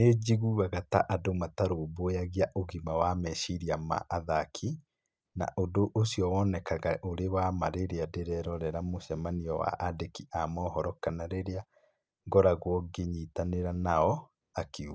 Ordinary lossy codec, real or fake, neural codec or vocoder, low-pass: none; real; none; none